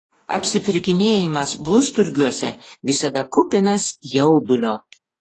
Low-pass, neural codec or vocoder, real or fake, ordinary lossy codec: 10.8 kHz; codec, 44.1 kHz, 2.6 kbps, DAC; fake; AAC, 32 kbps